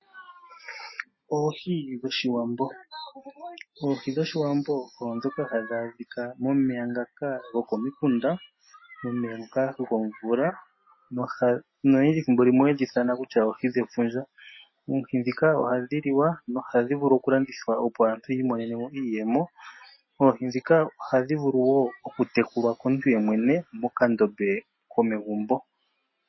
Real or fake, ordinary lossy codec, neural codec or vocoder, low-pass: real; MP3, 24 kbps; none; 7.2 kHz